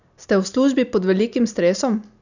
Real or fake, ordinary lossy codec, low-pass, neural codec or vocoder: real; none; 7.2 kHz; none